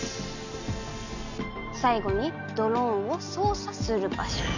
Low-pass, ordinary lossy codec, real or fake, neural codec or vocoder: 7.2 kHz; none; real; none